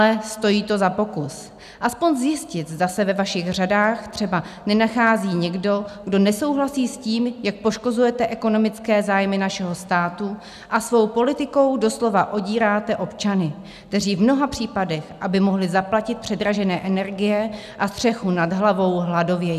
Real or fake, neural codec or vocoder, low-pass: real; none; 14.4 kHz